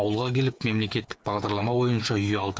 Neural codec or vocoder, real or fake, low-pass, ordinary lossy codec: codec, 16 kHz, 8 kbps, FreqCodec, smaller model; fake; none; none